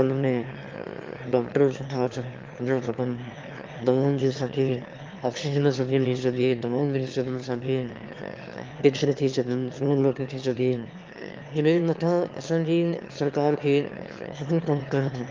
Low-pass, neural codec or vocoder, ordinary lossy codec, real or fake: 7.2 kHz; autoencoder, 22.05 kHz, a latent of 192 numbers a frame, VITS, trained on one speaker; Opus, 32 kbps; fake